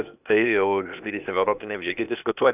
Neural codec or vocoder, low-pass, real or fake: codec, 16 kHz, 0.8 kbps, ZipCodec; 3.6 kHz; fake